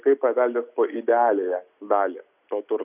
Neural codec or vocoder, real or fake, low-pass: none; real; 3.6 kHz